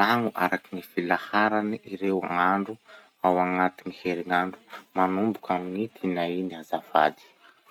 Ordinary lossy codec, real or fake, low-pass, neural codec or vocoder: none; real; 19.8 kHz; none